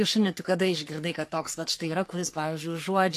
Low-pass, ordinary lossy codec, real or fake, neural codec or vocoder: 14.4 kHz; AAC, 64 kbps; fake; codec, 44.1 kHz, 3.4 kbps, Pupu-Codec